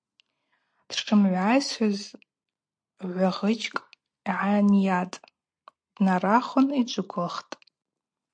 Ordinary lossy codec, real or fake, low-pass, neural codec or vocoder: MP3, 48 kbps; real; 9.9 kHz; none